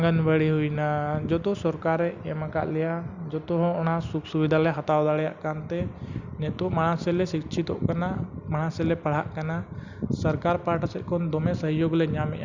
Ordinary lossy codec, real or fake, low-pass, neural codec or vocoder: Opus, 64 kbps; real; 7.2 kHz; none